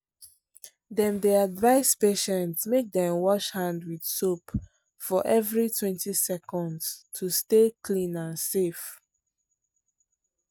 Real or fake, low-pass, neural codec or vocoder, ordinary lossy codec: real; none; none; none